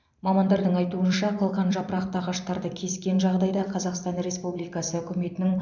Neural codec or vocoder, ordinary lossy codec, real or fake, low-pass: vocoder, 44.1 kHz, 128 mel bands every 512 samples, BigVGAN v2; none; fake; 7.2 kHz